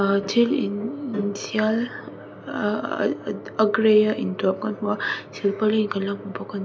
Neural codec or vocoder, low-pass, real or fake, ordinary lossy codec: none; none; real; none